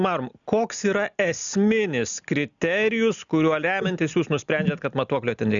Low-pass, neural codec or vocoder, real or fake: 7.2 kHz; none; real